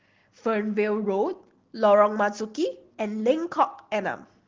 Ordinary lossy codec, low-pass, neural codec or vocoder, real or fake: Opus, 16 kbps; 7.2 kHz; none; real